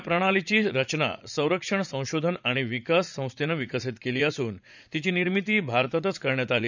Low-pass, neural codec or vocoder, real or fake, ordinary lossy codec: 7.2 kHz; vocoder, 44.1 kHz, 80 mel bands, Vocos; fake; none